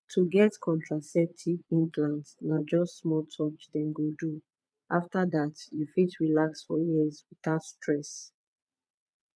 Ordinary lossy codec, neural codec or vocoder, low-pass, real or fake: none; vocoder, 22.05 kHz, 80 mel bands, Vocos; none; fake